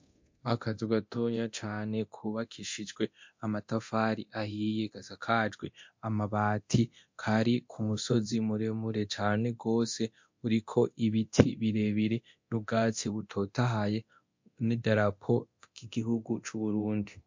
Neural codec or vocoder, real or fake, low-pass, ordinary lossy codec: codec, 24 kHz, 0.9 kbps, DualCodec; fake; 7.2 kHz; MP3, 48 kbps